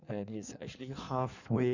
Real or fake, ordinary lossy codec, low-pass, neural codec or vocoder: fake; none; 7.2 kHz; codec, 16 kHz in and 24 kHz out, 1.1 kbps, FireRedTTS-2 codec